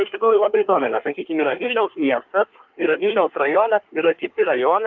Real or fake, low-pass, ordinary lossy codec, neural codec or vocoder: fake; 7.2 kHz; Opus, 24 kbps; codec, 24 kHz, 1 kbps, SNAC